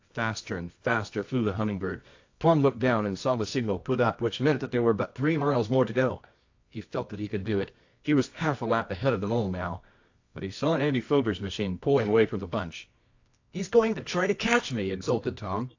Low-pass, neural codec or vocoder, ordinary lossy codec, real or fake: 7.2 kHz; codec, 24 kHz, 0.9 kbps, WavTokenizer, medium music audio release; AAC, 48 kbps; fake